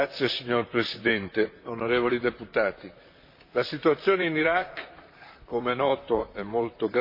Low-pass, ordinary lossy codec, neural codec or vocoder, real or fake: 5.4 kHz; MP3, 32 kbps; vocoder, 44.1 kHz, 128 mel bands, Pupu-Vocoder; fake